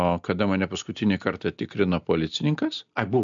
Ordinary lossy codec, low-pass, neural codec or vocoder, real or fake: MP3, 64 kbps; 7.2 kHz; none; real